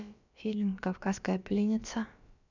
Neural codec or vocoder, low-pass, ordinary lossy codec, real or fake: codec, 16 kHz, about 1 kbps, DyCAST, with the encoder's durations; 7.2 kHz; none; fake